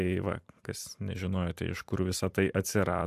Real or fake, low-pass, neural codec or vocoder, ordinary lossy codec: fake; 14.4 kHz; vocoder, 44.1 kHz, 128 mel bands every 512 samples, BigVGAN v2; AAC, 96 kbps